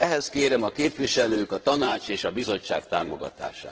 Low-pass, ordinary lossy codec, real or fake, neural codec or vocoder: 7.2 kHz; Opus, 16 kbps; fake; codec, 16 kHz in and 24 kHz out, 2.2 kbps, FireRedTTS-2 codec